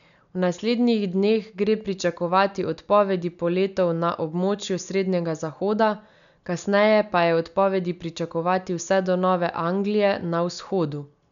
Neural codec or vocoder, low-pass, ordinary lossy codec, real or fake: none; 7.2 kHz; none; real